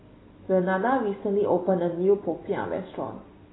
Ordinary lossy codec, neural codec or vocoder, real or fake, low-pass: AAC, 16 kbps; none; real; 7.2 kHz